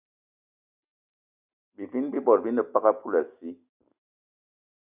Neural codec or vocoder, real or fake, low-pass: none; real; 3.6 kHz